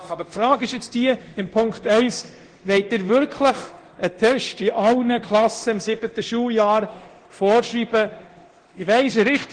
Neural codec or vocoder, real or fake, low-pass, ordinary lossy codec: codec, 24 kHz, 0.9 kbps, DualCodec; fake; 9.9 kHz; Opus, 16 kbps